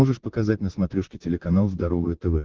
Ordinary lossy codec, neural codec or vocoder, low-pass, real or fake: Opus, 16 kbps; vocoder, 44.1 kHz, 128 mel bands, Pupu-Vocoder; 7.2 kHz; fake